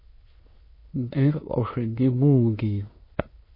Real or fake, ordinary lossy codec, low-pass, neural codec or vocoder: fake; MP3, 24 kbps; 5.4 kHz; autoencoder, 22.05 kHz, a latent of 192 numbers a frame, VITS, trained on many speakers